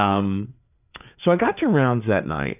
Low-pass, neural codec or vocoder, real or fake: 3.6 kHz; codec, 16 kHz, 4 kbps, FreqCodec, larger model; fake